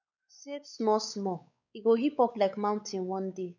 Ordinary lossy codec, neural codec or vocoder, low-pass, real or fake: none; codec, 16 kHz, 4 kbps, X-Codec, WavLM features, trained on Multilingual LibriSpeech; 7.2 kHz; fake